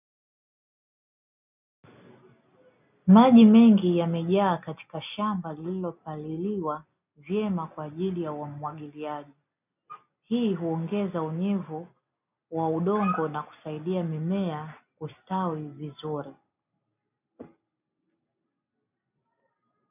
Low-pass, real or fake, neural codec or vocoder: 3.6 kHz; real; none